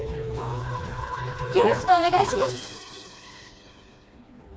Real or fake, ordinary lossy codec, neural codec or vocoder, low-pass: fake; none; codec, 16 kHz, 2 kbps, FreqCodec, smaller model; none